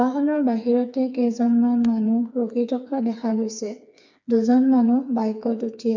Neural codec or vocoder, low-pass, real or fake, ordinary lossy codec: codec, 16 kHz, 4 kbps, FreqCodec, smaller model; 7.2 kHz; fake; MP3, 64 kbps